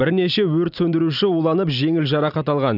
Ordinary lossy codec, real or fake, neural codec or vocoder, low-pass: none; real; none; 5.4 kHz